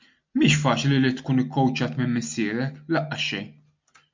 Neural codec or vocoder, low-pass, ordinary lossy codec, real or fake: none; 7.2 kHz; MP3, 64 kbps; real